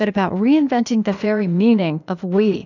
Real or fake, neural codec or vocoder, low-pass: fake; codec, 16 kHz, 0.8 kbps, ZipCodec; 7.2 kHz